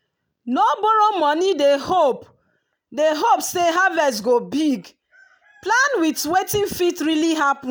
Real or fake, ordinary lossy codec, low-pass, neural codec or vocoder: real; none; none; none